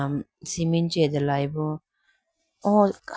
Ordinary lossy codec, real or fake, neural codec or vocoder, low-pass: none; real; none; none